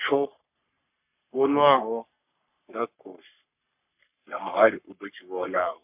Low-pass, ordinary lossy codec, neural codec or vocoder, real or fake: 3.6 kHz; MP3, 32 kbps; codec, 44.1 kHz, 3.4 kbps, Pupu-Codec; fake